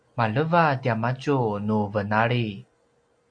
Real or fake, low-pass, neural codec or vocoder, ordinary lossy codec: real; 9.9 kHz; none; AAC, 64 kbps